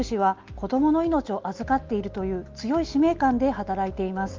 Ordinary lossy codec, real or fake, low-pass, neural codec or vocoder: Opus, 32 kbps; real; 7.2 kHz; none